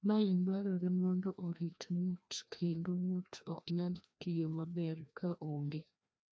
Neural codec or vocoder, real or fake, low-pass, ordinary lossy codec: codec, 16 kHz, 1 kbps, FreqCodec, larger model; fake; none; none